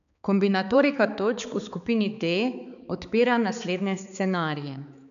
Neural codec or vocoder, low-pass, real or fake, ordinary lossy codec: codec, 16 kHz, 4 kbps, X-Codec, HuBERT features, trained on balanced general audio; 7.2 kHz; fake; none